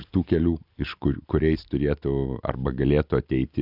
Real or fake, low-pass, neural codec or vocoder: real; 5.4 kHz; none